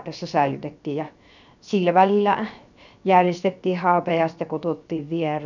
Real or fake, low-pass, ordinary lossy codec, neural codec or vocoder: fake; 7.2 kHz; none; codec, 16 kHz, 0.7 kbps, FocalCodec